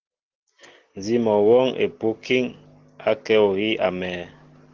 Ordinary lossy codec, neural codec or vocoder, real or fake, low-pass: Opus, 16 kbps; none; real; 7.2 kHz